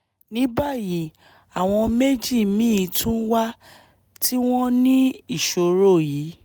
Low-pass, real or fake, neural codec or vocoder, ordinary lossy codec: none; real; none; none